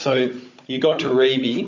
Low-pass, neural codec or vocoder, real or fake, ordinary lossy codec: 7.2 kHz; codec, 16 kHz, 16 kbps, FunCodec, trained on Chinese and English, 50 frames a second; fake; MP3, 48 kbps